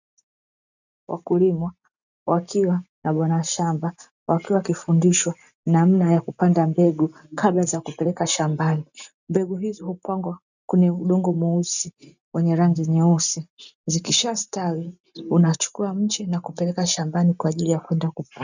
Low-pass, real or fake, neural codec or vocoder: 7.2 kHz; real; none